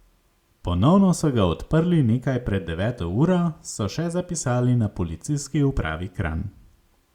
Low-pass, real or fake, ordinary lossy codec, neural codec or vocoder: 19.8 kHz; real; Opus, 64 kbps; none